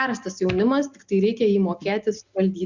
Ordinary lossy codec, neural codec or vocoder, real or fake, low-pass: Opus, 64 kbps; none; real; 7.2 kHz